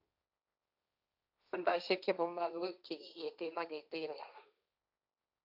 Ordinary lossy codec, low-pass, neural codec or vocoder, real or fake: none; 5.4 kHz; codec, 16 kHz, 1.1 kbps, Voila-Tokenizer; fake